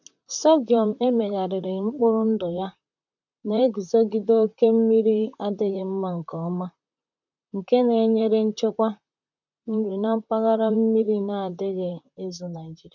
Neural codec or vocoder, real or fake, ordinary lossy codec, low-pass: vocoder, 44.1 kHz, 128 mel bands, Pupu-Vocoder; fake; none; 7.2 kHz